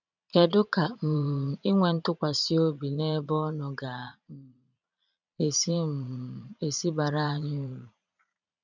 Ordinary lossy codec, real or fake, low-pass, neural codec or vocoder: none; fake; 7.2 kHz; vocoder, 22.05 kHz, 80 mel bands, Vocos